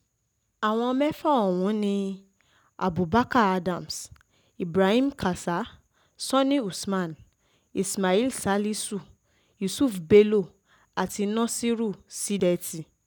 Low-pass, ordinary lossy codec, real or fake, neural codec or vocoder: 19.8 kHz; none; real; none